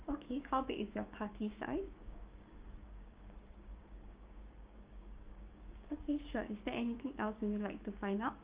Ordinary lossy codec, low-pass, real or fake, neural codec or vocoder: Opus, 32 kbps; 3.6 kHz; fake; codec, 16 kHz, 6 kbps, DAC